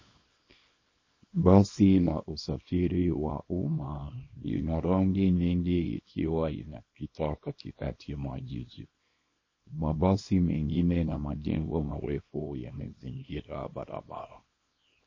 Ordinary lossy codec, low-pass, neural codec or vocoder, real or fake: MP3, 32 kbps; 7.2 kHz; codec, 24 kHz, 0.9 kbps, WavTokenizer, small release; fake